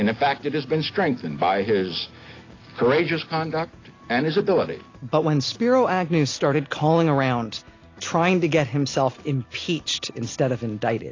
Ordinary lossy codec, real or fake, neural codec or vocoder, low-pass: AAC, 32 kbps; real; none; 7.2 kHz